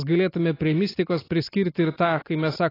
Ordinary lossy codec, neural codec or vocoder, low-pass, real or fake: AAC, 24 kbps; none; 5.4 kHz; real